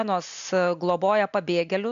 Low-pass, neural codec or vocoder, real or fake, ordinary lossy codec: 7.2 kHz; none; real; AAC, 96 kbps